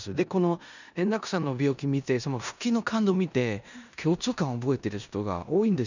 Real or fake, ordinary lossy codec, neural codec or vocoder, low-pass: fake; none; codec, 16 kHz in and 24 kHz out, 0.9 kbps, LongCat-Audio-Codec, four codebook decoder; 7.2 kHz